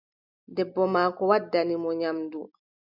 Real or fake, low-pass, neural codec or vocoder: real; 5.4 kHz; none